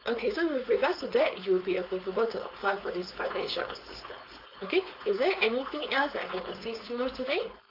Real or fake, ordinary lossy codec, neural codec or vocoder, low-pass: fake; AAC, 32 kbps; codec, 16 kHz, 4.8 kbps, FACodec; 5.4 kHz